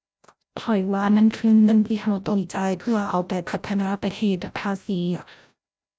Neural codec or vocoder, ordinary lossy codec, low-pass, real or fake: codec, 16 kHz, 0.5 kbps, FreqCodec, larger model; none; none; fake